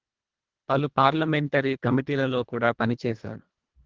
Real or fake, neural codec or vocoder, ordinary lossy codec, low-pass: fake; codec, 24 kHz, 1.5 kbps, HILCodec; Opus, 16 kbps; 7.2 kHz